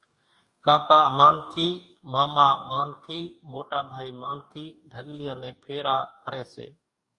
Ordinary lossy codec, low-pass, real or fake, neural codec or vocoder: Opus, 64 kbps; 10.8 kHz; fake; codec, 44.1 kHz, 2.6 kbps, DAC